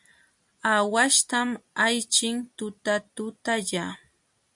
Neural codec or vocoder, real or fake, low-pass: none; real; 10.8 kHz